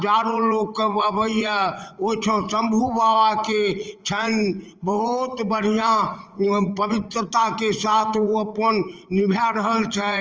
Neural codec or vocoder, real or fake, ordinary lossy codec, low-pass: vocoder, 44.1 kHz, 80 mel bands, Vocos; fake; Opus, 24 kbps; 7.2 kHz